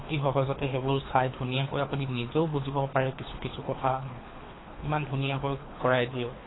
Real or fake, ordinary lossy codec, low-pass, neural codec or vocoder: fake; AAC, 16 kbps; 7.2 kHz; codec, 24 kHz, 3 kbps, HILCodec